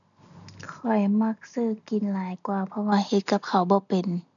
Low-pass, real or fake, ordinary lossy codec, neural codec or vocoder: 7.2 kHz; real; none; none